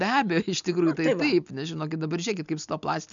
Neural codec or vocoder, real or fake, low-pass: none; real; 7.2 kHz